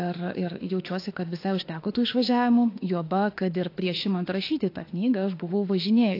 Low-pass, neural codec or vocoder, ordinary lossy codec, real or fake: 5.4 kHz; autoencoder, 48 kHz, 32 numbers a frame, DAC-VAE, trained on Japanese speech; AAC, 32 kbps; fake